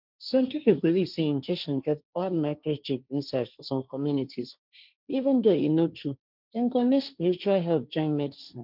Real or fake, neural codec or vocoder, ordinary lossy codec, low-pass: fake; codec, 16 kHz, 1.1 kbps, Voila-Tokenizer; none; 5.4 kHz